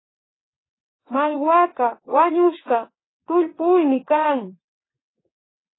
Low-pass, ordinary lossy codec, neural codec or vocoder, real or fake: 7.2 kHz; AAC, 16 kbps; vocoder, 22.05 kHz, 80 mel bands, WaveNeXt; fake